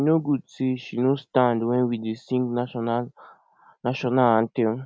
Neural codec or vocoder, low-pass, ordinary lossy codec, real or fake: none; none; none; real